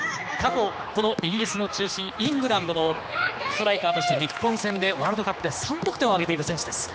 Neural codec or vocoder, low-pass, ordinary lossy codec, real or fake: codec, 16 kHz, 2 kbps, X-Codec, HuBERT features, trained on general audio; none; none; fake